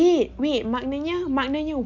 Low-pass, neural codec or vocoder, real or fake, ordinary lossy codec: 7.2 kHz; none; real; MP3, 64 kbps